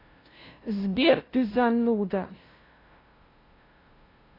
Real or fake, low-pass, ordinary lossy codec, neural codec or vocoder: fake; 5.4 kHz; AAC, 24 kbps; codec, 16 kHz, 0.5 kbps, FunCodec, trained on LibriTTS, 25 frames a second